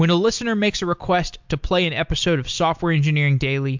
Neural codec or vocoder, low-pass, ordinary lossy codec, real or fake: none; 7.2 kHz; MP3, 64 kbps; real